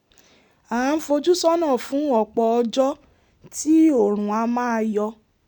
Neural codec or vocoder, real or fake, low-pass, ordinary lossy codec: none; real; 19.8 kHz; none